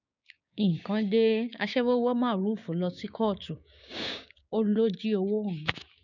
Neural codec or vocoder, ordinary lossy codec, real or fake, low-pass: codec, 16 kHz, 6 kbps, DAC; none; fake; 7.2 kHz